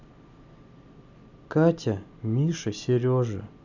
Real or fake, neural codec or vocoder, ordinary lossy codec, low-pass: real; none; none; 7.2 kHz